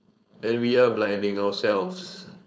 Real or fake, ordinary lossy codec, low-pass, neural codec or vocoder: fake; none; none; codec, 16 kHz, 4.8 kbps, FACodec